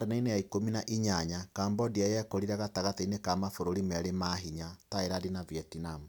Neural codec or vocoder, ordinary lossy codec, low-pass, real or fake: none; none; none; real